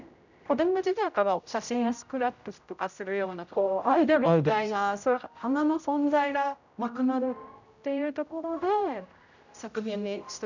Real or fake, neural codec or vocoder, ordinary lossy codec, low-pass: fake; codec, 16 kHz, 0.5 kbps, X-Codec, HuBERT features, trained on general audio; none; 7.2 kHz